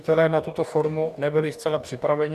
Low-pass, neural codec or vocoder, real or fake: 14.4 kHz; codec, 44.1 kHz, 2.6 kbps, DAC; fake